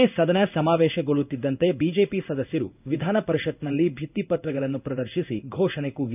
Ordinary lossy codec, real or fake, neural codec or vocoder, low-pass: none; fake; codec, 16 kHz in and 24 kHz out, 1 kbps, XY-Tokenizer; 3.6 kHz